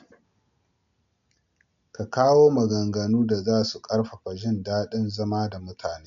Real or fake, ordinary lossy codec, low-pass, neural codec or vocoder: real; MP3, 64 kbps; 7.2 kHz; none